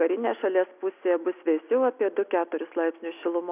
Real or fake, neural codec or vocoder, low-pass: real; none; 3.6 kHz